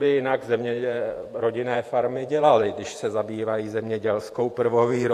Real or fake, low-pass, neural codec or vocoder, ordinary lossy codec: fake; 14.4 kHz; vocoder, 48 kHz, 128 mel bands, Vocos; AAC, 64 kbps